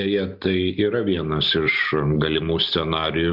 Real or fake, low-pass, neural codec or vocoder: real; 5.4 kHz; none